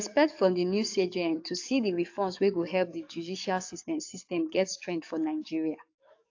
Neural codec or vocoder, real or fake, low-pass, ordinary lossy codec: codec, 44.1 kHz, 7.8 kbps, DAC; fake; 7.2 kHz; AAC, 48 kbps